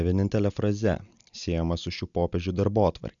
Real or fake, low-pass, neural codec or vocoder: real; 7.2 kHz; none